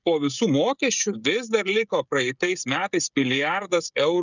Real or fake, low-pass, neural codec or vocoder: fake; 7.2 kHz; codec, 16 kHz, 16 kbps, FreqCodec, smaller model